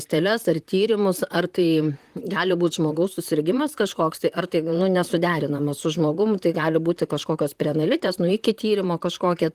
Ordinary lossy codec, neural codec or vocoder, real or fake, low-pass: Opus, 24 kbps; vocoder, 44.1 kHz, 128 mel bands, Pupu-Vocoder; fake; 14.4 kHz